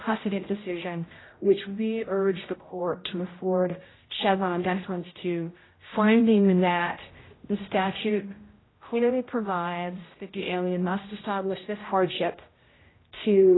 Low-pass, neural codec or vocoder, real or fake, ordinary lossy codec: 7.2 kHz; codec, 16 kHz, 0.5 kbps, X-Codec, HuBERT features, trained on general audio; fake; AAC, 16 kbps